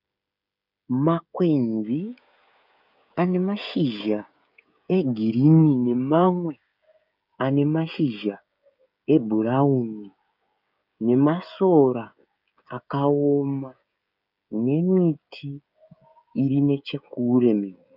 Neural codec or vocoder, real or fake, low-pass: codec, 16 kHz, 16 kbps, FreqCodec, smaller model; fake; 5.4 kHz